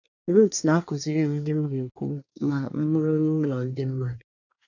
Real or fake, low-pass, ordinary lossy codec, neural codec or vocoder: fake; 7.2 kHz; AAC, 48 kbps; codec, 24 kHz, 1 kbps, SNAC